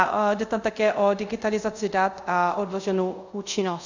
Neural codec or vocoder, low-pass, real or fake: codec, 24 kHz, 0.5 kbps, DualCodec; 7.2 kHz; fake